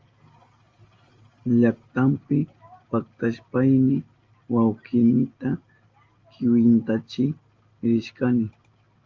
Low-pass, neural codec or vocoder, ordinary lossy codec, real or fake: 7.2 kHz; none; Opus, 32 kbps; real